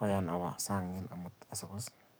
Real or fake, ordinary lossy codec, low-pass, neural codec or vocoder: fake; none; none; codec, 44.1 kHz, 7.8 kbps, DAC